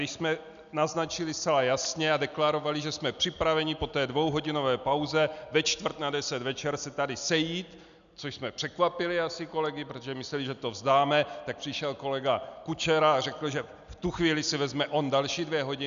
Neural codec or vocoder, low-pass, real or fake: none; 7.2 kHz; real